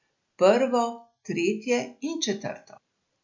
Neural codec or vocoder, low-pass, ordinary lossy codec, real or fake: none; 7.2 kHz; MP3, 48 kbps; real